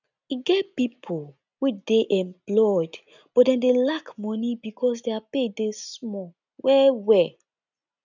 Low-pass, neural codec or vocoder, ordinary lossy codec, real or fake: 7.2 kHz; none; none; real